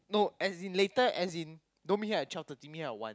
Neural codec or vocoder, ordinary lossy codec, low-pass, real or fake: none; none; none; real